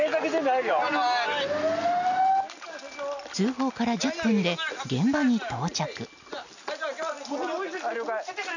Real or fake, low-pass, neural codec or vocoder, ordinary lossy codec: real; 7.2 kHz; none; none